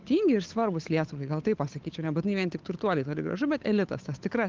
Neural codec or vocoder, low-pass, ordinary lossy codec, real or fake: codec, 16 kHz, 8 kbps, FunCodec, trained on Chinese and English, 25 frames a second; 7.2 kHz; Opus, 24 kbps; fake